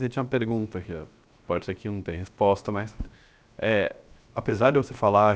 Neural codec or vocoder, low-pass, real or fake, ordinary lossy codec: codec, 16 kHz, 0.7 kbps, FocalCodec; none; fake; none